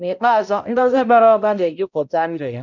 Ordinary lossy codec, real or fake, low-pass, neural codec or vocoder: none; fake; 7.2 kHz; codec, 16 kHz, 0.5 kbps, X-Codec, HuBERT features, trained on balanced general audio